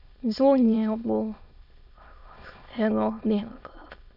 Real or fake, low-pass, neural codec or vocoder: fake; 5.4 kHz; autoencoder, 22.05 kHz, a latent of 192 numbers a frame, VITS, trained on many speakers